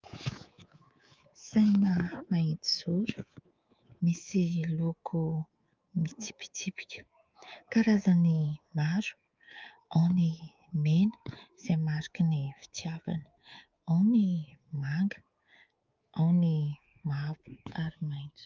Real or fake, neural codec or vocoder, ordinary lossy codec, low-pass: fake; codec, 24 kHz, 3.1 kbps, DualCodec; Opus, 24 kbps; 7.2 kHz